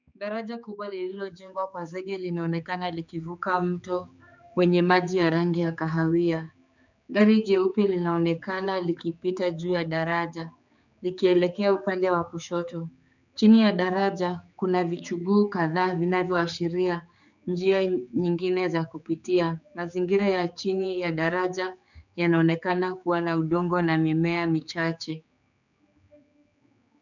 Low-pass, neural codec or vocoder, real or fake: 7.2 kHz; codec, 16 kHz, 4 kbps, X-Codec, HuBERT features, trained on general audio; fake